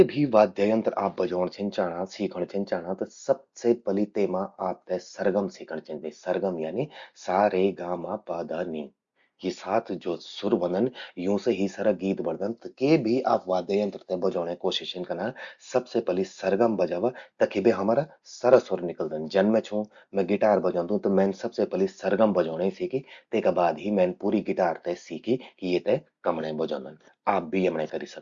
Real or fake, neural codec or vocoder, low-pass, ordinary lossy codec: real; none; 7.2 kHz; Opus, 64 kbps